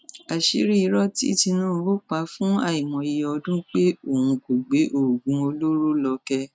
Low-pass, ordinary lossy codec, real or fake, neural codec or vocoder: none; none; real; none